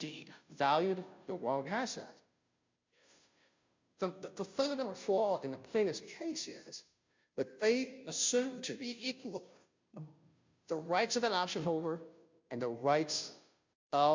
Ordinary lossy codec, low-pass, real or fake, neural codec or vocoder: MP3, 64 kbps; 7.2 kHz; fake; codec, 16 kHz, 0.5 kbps, FunCodec, trained on Chinese and English, 25 frames a second